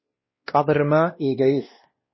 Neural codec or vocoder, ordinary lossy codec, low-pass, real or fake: codec, 16 kHz, 1 kbps, X-Codec, WavLM features, trained on Multilingual LibriSpeech; MP3, 24 kbps; 7.2 kHz; fake